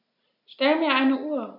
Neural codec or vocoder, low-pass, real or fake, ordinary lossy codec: none; 5.4 kHz; real; none